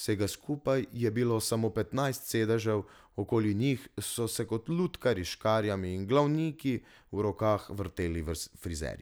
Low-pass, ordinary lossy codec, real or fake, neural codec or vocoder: none; none; real; none